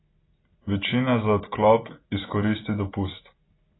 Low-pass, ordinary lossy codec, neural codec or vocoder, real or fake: 7.2 kHz; AAC, 16 kbps; none; real